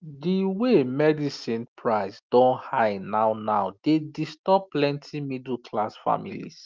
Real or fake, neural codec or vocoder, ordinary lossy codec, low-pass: real; none; Opus, 32 kbps; 7.2 kHz